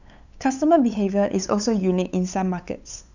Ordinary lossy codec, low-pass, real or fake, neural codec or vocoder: none; 7.2 kHz; fake; codec, 16 kHz, 8 kbps, FunCodec, trained on LibriTTS, 25 frames a second